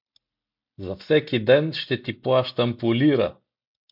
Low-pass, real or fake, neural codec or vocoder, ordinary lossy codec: 5.4 kHz; real; none; MP3, 48 kbps